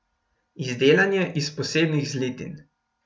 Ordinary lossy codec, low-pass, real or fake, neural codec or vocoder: none; none; real; none